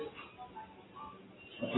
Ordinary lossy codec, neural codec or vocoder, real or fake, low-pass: AAC, 16 kbps; none; real; 7.2 kHz